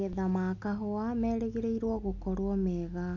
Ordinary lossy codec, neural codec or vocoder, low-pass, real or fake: none; none; 7.2 kHz; real